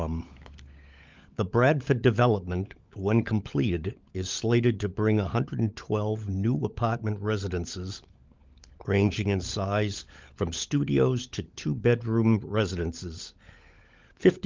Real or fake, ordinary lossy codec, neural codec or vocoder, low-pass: fake; Opus, 24 kbps; codec, 16 kHz, 16 kbps, FunCodec, trained on LibriTTS, 50 frames a second; 7.2 kHz